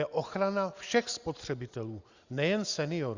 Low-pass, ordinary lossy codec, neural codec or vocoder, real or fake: 7.2 kHz; Opus, 64 kbps; none; real